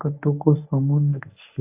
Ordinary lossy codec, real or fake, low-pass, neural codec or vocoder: AAC, 32 kbps; fake; 3.6 kHz; codec, 24 kHz, 0.9 kbps, WavTokenizer, medium speech release version 1